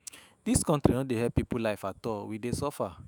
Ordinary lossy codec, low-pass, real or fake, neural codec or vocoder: none; none; fake; autoencoder, 48 kHz, 128 numbers a frame, DAC-VAE, trained on Japanese speech